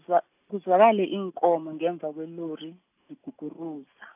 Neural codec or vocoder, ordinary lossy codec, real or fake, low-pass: none; AAC, 32 kbps; real; 3.6 kHz